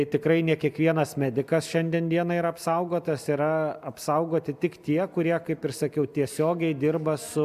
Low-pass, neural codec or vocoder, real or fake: 14.4 kHz; none; real